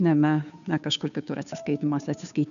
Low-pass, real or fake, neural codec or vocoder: 7.2 kHz; fake; codec, 16 kHz, 2 kbps, FunCodec, trained on Chinese and English, 25 frames a second